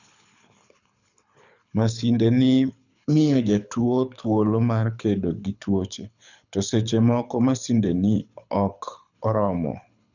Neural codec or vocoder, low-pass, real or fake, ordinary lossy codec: codec, 24 kHz, 6 kbps, HILCodec; 7.2 kHz; fake; none